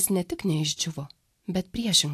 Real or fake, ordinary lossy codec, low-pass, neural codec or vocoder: real; AAC, 64 kbps; 14.4 kHz; none